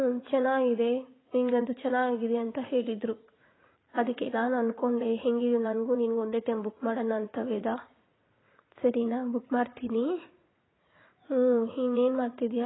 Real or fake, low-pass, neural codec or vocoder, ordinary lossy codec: fake; 7.2 kHz; vocoder, 44.1 kHz, 80 mel bands, Vocos; AAC, 16 kbps